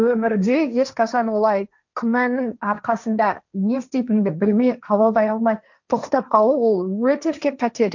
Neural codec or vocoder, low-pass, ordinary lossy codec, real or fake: codec, 16 kHz, 1.1 kbps, Voila-Tokenizer; 7.2 kHz; none; fake